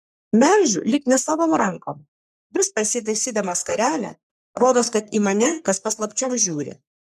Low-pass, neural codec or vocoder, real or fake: 14.4 kHz; codec, 44.1 kHz, 3.4 kbps, Pupu-Codec; fake